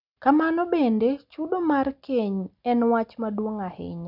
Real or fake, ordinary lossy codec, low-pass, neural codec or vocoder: real; none; 5.4 kHz; none